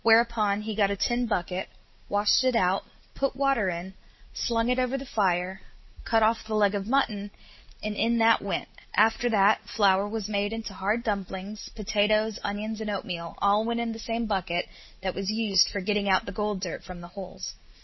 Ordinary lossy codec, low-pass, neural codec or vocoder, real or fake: MP3, 24 kbps; 7.2 kHz; none; real